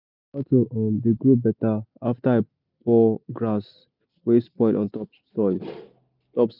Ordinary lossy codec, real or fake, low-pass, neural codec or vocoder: none; real; 5.4 kHz; none